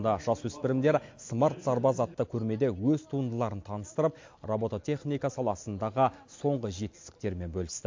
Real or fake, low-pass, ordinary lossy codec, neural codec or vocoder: real; 7.2 kHz; MP3, 48 kbps; none